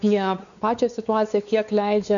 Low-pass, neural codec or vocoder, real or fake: 7.2 kHz; codec, 16 kHz, 4 kbps, X-Codec, WavLM features, trained on Multilingual LibriSpeech; fake